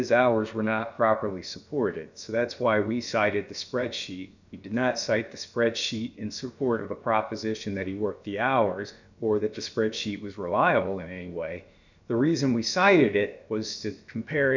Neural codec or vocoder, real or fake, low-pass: codec, 16 kHz, about 1 kbps, DyCAST, with the encoder's durations; fake; 7.2 kHz